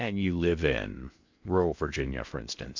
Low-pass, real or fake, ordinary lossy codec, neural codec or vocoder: 7.2 kHz; fake; MP3, 64 kbps; codec, 16 kHz in and 24 kHz out, 0.6 kbps, FocalCodec, streaming, 2048 codes